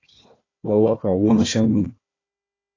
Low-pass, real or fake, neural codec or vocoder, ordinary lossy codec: 7.2 kHz; fake; codec, 16 kHz, 1 kbps, FunCodec, trained on Chinese and English, 50 frames a second; AAC, 32 kbps